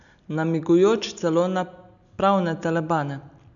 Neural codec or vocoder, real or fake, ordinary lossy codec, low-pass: none; real; none; 7.2 kHz